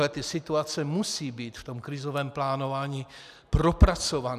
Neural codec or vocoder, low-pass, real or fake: none; 14.4 kHz; real